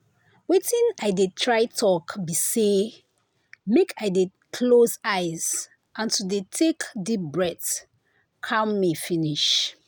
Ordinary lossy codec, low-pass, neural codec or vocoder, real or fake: none; none; none; real